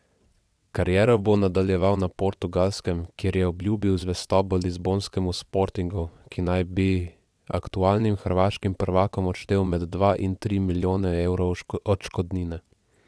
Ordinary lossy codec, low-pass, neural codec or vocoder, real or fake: none; none; vocoder, 22.05 kHz, 80 mel bands, WaveNeXt; fake